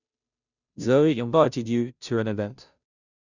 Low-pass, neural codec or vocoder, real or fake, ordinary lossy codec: 7.2 kHz; codec, 16 kHz, 0.5 kbps, FunCodec, trained on Chinese and English, 25 frames a second; fake; none